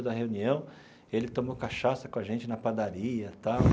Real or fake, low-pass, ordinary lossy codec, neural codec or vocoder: real; none; none; none